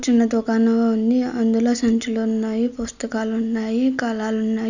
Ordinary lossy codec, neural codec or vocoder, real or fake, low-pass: none; none; real; 7.2 kHz